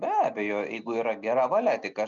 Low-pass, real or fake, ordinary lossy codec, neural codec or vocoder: 7.2 kHz; real; MP3, 96 kbps; none